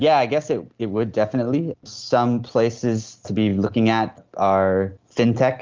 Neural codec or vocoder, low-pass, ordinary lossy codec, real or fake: none; 7.2 kHz; Opus, 32 kbps; real